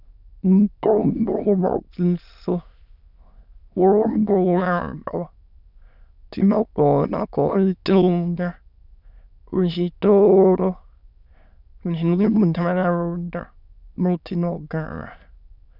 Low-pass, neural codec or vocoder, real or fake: 5.4 kHz; autoencoder, 22.05 kHz, a latent of 192 numbers a frame, VITS, trained on many speakers; fake